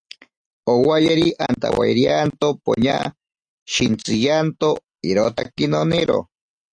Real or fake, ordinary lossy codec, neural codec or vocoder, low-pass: real; AAC, 64 kbps; none; 9.9 kHz